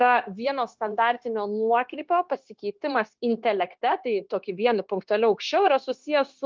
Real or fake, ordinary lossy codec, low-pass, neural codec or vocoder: fake; Opus, 32 kbps; 7.2 kHz; codec, 16 kHz, 0.9 kbps, LongCat-Audio-Codec